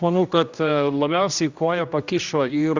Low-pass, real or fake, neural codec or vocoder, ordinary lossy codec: 7.2 kHz; fake; codec, 16 kHz, 1 kbps, X-Codec, HuBERT features, trained on general audio; Opus, 64 kbps